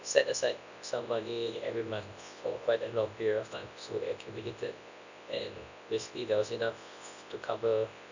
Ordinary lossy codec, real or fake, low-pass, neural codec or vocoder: none; fake; 7.2 kHz; codec, 24 kHz, 0.9 kbps, WavTokenizer, large speech release